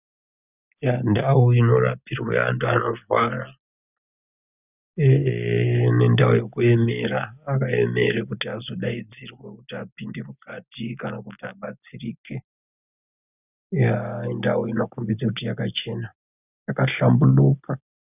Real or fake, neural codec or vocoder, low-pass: real; none; 3.6 kHz